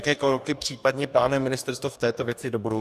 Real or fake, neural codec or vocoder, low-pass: fake; codec, 44.1 kHz, 2.6 kbps, DAC; 14.4 kHz